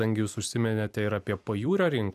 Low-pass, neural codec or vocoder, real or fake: 14.4 kHz; vocoder, 44.1 kHz, 128 mel bands every 256 samples, BigVGAN v2; fake